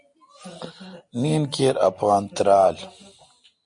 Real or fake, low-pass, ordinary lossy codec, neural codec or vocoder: real; 9.9 kHz; MP3, 48 kbps; none